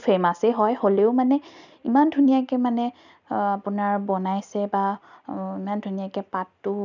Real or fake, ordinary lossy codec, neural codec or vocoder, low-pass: real; none; none; 7.2 kHz